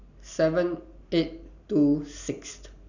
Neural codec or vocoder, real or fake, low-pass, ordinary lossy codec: none; real; 7.2 kHz; none